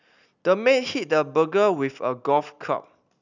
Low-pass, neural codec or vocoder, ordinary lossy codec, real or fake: 7.2 kHz; none; none; real